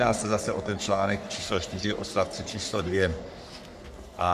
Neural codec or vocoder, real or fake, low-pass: codec, 44.1 kHz, 3.4 kbps, Pupu-Codec; fake; 14.4 kHz